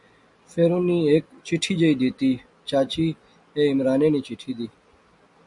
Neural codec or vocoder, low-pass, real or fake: none; 10.8 kHz; real